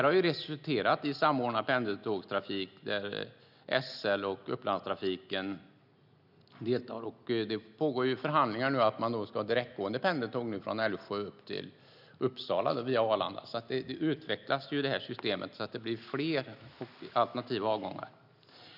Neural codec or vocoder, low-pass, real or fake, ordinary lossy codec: none; 5.4 kHz; real; none